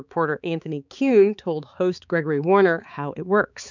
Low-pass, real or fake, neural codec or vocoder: 7.2 kHz; fake; codec, 16 kHz, 4 kbps, X-Codec, HuBERT features, trained on balanced general audio